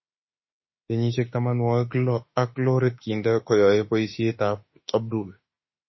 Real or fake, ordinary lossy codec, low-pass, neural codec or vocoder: fake; MP3, 24 kbps; 7.2 kHz; autoencoder, 48 kHz, 32 numbers a frame, DAC-VAE, trained on Japanese speech